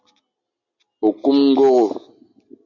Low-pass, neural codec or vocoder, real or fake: 7.2 kHz; none; real